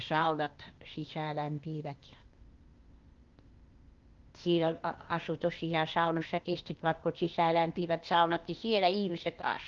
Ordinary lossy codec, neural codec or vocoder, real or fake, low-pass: Opus, 32 kbps; codec, 16 kHz, 0.8 kbps, ZipCodec; fake; 7.2 kHz